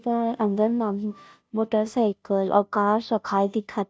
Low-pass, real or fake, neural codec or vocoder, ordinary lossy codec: none; fake; codec, 16 kHz, 0.5 kbps, FunCodec, trained on Chinese and English, 25 frames a second; none